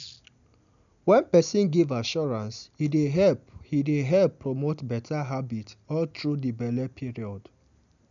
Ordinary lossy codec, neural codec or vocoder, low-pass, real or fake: none; none; 7.2 kHz; real